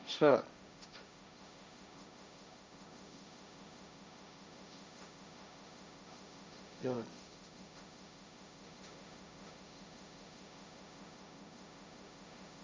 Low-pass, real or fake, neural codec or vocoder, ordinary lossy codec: none; fake; codec, 16 kHz, 1.1 kbps, Voila-Tokenizer; none